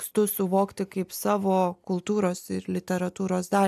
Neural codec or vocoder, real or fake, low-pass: none; real; 14.4 kHz